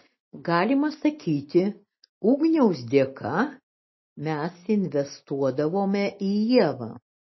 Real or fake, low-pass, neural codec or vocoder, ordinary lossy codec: real; 7.2 kHz; none; MP3, 24 kbps